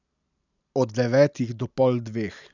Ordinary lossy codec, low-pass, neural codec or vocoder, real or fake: none; 7.2 kHz; none; real